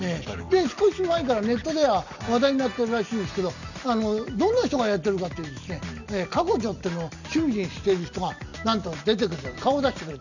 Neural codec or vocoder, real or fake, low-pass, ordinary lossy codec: none; real; 7.2 kHz; none